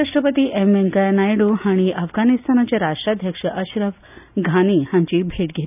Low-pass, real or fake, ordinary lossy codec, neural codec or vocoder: 3.6 kHz; real; none; none